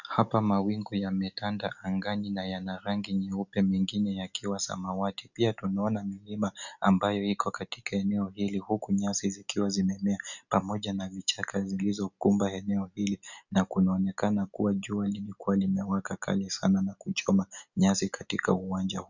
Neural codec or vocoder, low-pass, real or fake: none; 7.2 kHz; real